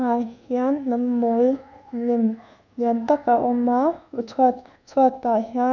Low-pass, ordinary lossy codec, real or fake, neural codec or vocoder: 7.2 kHz; none; fake; codec, 24 kHz, 1.2 kbps, DualCodec